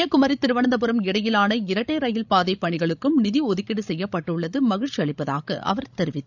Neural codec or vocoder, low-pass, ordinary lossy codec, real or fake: codec, 16 kHz, 16 kbps, FreqCodec, larger model; 7.2 kHz; none; fake